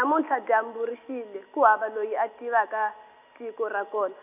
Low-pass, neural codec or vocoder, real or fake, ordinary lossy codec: 3.6 kHz; none; real; none